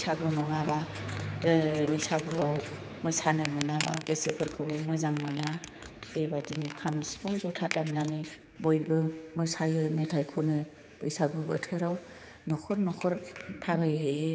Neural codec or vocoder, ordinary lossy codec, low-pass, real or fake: codec, 16 kHz, 4 kbps, X-Codec, HuBERT features, trained on general audio; none; none; fake